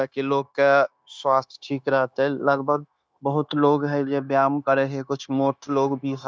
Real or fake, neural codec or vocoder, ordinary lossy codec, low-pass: fake; codec, 16 kHz, 0.9 kbps, LongCat-Audio-Codec; none; none